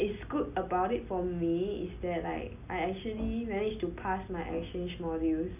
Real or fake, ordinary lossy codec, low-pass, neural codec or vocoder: real; none; 3.6 kHz; none